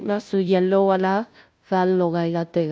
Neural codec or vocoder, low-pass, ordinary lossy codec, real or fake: codec, 16 kHz, 0.5 kbps, FunCodec, trained on Chinese and English, 25 frames a second; none; none; fake